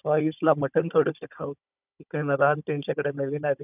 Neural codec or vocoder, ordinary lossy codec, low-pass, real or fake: codec, 16 kHz, 16 kbps, FunCodec, trained on Chinese and English, 50 frames a second; none; 3.6 kHz; fake